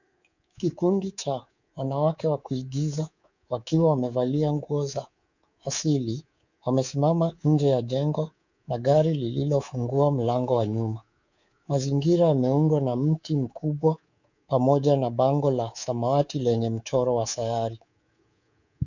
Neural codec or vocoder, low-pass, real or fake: codec, 24 kHz, 3.1 kbps, DualCodec; 7.2 kHz; fake